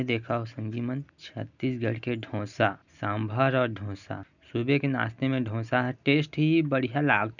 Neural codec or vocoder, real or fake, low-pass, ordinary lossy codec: none; real; 7.2 kHz; none